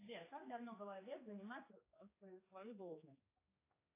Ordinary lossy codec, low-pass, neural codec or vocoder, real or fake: AAC, 24 kbps; 3.6 kHz; codec, 16 kHz, 2 kbps, X-Codec, HuBERT features, trained on general audio; fake